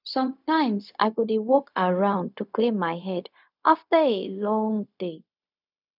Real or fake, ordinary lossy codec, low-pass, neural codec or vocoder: fake; none; 5.4 kHz; codec, 16 kHz, 0.4 kbps, LongCat-Audio-Codec